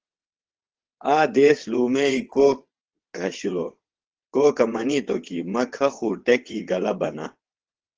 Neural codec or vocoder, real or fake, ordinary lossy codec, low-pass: vocoder, 22.05 kHz, 80 mel bands, WaveNeXt; fake; Opus, 16 kbps; 7.2 kHz